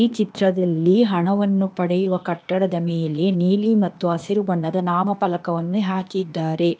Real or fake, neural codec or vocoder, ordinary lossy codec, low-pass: fake; codec, 16 kHz, 0.8 kbps, ZipCodec; none; none